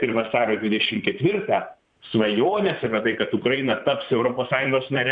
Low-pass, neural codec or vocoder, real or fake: 9.9 kHz; vocoder, 44.1 kHz, 128 mel bands, Pupu-Vocoder; fake